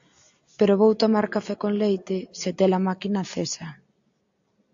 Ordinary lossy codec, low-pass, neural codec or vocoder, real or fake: AAC, 64 kbps; 7.2 kHz; none; real